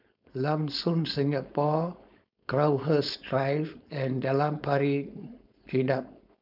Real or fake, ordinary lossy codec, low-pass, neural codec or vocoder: fake; none; 5.4 kHz; codec, 16 kHz, 4.8 kbps, FACodec